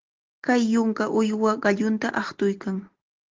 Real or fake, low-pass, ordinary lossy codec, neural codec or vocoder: real; 7.2 kHz; Opus, 16 kbps; none